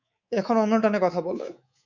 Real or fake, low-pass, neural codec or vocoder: fake; 7.2 kHz; codec, 24 kHz, 3.1 kbps, DualCodec